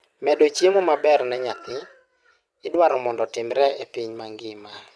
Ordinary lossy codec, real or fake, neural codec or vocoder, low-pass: none; fake; vocoder, 22.05 kHz, 80 mel bands, WaveNeXt; none